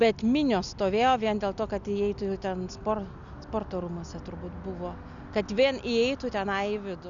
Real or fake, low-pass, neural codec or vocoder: real; 7.2 kHz; none